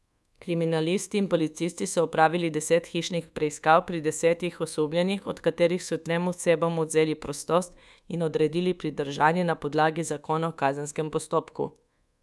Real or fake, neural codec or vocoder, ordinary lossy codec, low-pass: fake; codec, 24 kHz, 1.2 kbps, DualCodec; none; none